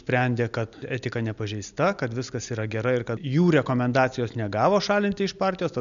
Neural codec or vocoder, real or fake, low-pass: none; real; 7.2 kHz